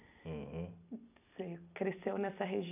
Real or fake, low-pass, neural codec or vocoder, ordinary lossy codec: real; 3.6 kHz; none; none